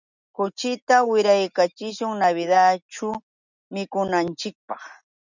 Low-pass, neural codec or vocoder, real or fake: 7.2 kHz; none; real